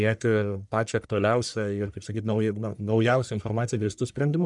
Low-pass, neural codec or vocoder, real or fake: 10.8 kHz; codec, 44.1 kHz, 1.7 kbps, Pupu-Codec; fake